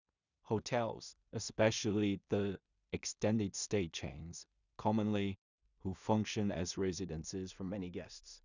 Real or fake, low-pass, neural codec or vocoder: fake; 7.2 kHz; codec, 16 kHz in and 24 kHz out, 0.4 kbps, LongCat-Audio-Codec, two codebook decoder